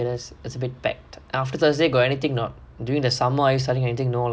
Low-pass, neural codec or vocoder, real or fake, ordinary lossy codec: none; none; real; none